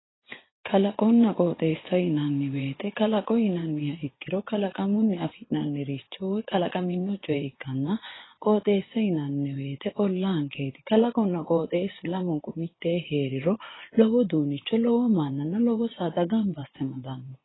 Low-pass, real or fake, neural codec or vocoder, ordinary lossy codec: 7.2 kHz; fake; vocoder, 24 kHz, 100 mel bands, Vocos; AAC, 16 kbps